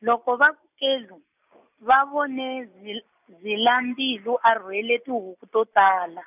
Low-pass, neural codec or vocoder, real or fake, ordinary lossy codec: 3.6 kHz; none; real; none